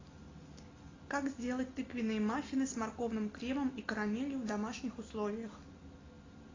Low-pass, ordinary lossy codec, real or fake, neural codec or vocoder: 7.2 kHz; AAC, 32 kbps; real; none